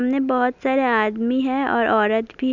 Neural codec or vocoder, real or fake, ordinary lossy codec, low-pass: none; real; none; 7.2 kHz